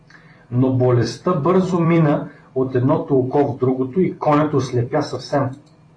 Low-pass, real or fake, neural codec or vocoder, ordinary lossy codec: 9.9 kHz; fake; vocoder, 44.1 kHz, 128 mel bands every 256 samples, BigVGAN v2; AAC, 32 kbps